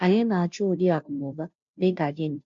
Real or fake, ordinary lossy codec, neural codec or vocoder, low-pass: fake; MP3, 64 kbps; codec, 16 kHz, 0.5 kbps, FunCodec, trained on Chinese and English, 25 frames a second; 7.2 kHz